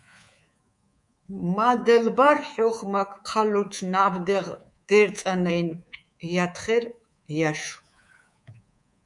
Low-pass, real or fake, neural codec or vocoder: 10.8 kHz; fake; codec, 24 kHz, 3.1 kbps, DualCodec